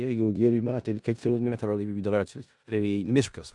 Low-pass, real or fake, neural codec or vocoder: 10.8 kHz; fake; codec, 16 kHz in and 24 kHz out, 0.4 kbps, LongCat-Audio-Codec, four codebook decoder